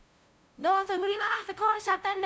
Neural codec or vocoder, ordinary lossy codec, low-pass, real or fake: codec, 16 kHz, 0.5 kbps, FunCodec, trained on LibriTTS, 25 frames a second; none; none; fake